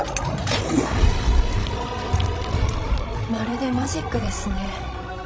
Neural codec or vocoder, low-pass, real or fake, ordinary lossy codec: codec, 16 kHz, 16 kbps, FreqCodec, larger model; none; fake; none